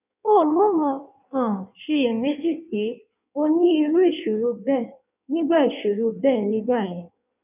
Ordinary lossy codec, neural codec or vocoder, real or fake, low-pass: none; codec, 16 kHz in and 24 kHz out, 1.1 kbps, FireRedTTS-2 codec; fake; 3.6 kHz